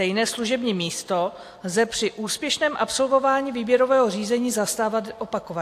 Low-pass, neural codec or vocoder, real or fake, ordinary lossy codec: 14.4 kHz; none; real; AAC, 64 kbps